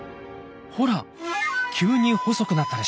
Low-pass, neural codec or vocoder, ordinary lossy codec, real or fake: none; none; none; real